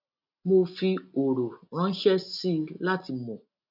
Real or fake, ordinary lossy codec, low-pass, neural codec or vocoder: real; none; 5.4 kHz; none